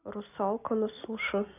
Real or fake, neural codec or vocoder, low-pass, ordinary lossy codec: real; none; 3.6 kHz; none